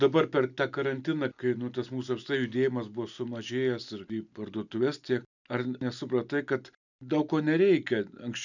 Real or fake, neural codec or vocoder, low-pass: real; none; 7.2 kHz